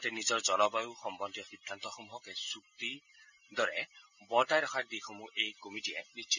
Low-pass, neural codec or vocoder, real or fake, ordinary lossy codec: 7.2 kHz; none; real; none